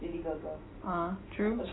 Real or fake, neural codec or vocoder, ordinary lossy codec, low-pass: real; none; AAC, 16 kbps; 7.2 kHz